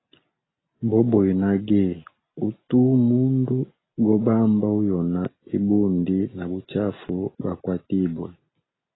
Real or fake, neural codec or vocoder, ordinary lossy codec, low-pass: real; none; AAC, 16 kbps; 7.2 kHz